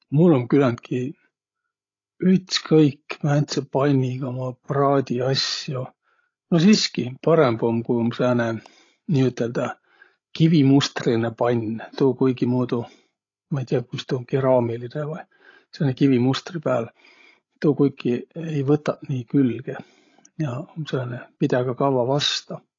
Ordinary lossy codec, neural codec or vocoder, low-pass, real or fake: AAC, 32 kbps; codec, 16 kHz, 16 kbps, FreqCodec, larger model; 7.2 kHz; fake